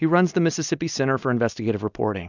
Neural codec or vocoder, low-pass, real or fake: none; 7.2 kHz; real